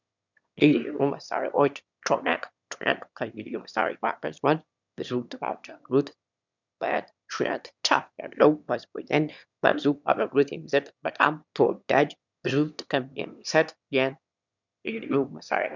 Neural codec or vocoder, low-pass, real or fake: autoencoder, 22.05 kHz, a latent of 192 numbers a frame, VITS, trained on one speaker; 7.2 kHz; fake